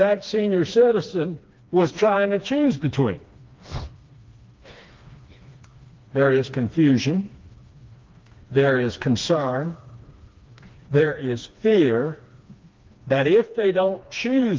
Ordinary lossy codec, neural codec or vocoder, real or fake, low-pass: Opus, 24 kbps; codec, 16 kHz, 2 kbps, FreqCodec, smaller model; fake; 7.2 kHz